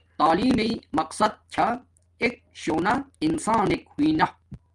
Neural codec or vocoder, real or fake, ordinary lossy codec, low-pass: none; real; Opus, 32 kbps; 10.8 kHz